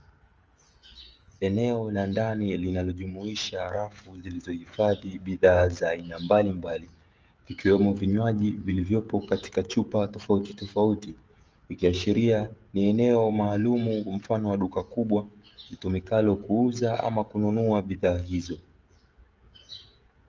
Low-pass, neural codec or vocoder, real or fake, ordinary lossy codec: 7.2 kHz; codec, 16 kHz, 16 kbps, FreqCodec, smaller model; fake; Opus, 24 kbps